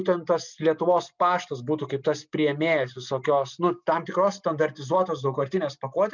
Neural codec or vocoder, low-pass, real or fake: none; 7.2 kHz; real